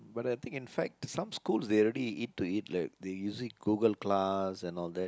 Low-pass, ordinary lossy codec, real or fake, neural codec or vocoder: none; none; real; none